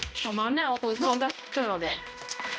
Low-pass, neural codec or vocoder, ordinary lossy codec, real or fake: none; codec, 16 kHz, 1 kbps, X-Codec, HuBERT features, trained on balanced general audio; none; fake